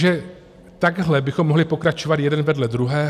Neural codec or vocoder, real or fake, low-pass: none; real; 14.4 kHz